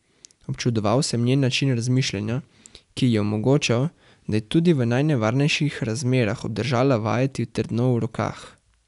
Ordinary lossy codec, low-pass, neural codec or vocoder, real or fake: none; 10.8 kHz; none; real